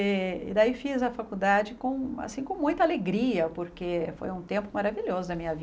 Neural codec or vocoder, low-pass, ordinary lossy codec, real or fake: none; none; none; real